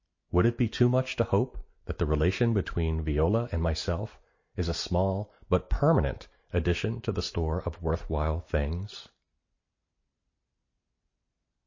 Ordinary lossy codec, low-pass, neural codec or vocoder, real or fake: MP3, 32 kbps; 7.2 kHz; none; real